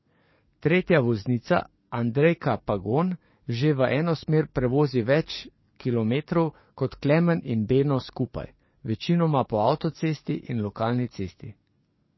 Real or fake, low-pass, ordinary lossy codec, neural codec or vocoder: fake; 7.2 kHz; MP3, 24 kbps; codec, 44.1 kHz, 7.8 kbps, DAC